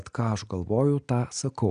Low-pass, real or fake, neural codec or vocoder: 9.9 kHz; real; none